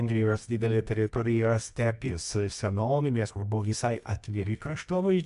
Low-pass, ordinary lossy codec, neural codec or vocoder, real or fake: 10.8 kHz; AAC, 96 kbps; codec, 24 kHz, 0.9 kbps, WavTokenizer, medium music audio release; fake